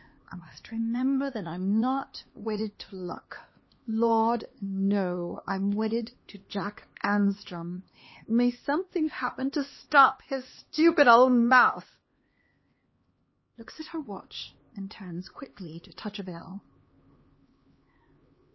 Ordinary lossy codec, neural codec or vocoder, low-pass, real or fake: MP3, 24 kbps; codec, 16 kHz, 2 kbps, X-Codec, HuBERT features, trained on LibriSpeech; 7.2 kHz; fake